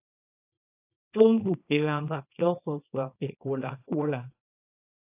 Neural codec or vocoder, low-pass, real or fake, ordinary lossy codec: codec, 24 kHz, 0.9 kbps, WavTokenizer, small release; 3.6 kHz; fake; AAC, 24 kbps